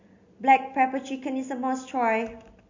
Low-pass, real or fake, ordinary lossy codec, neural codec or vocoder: 7.2 kHz; real; MP3, 48 kbps; none